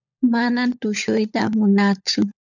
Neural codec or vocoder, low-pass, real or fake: codec, 16 kHz, 16 kbps, FunCodec, trained on LibriTTS, 50 frames a second; 7.2 kHz; fake